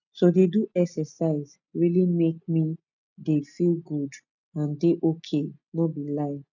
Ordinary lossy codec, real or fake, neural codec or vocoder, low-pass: none; real; none; 7.2 kHz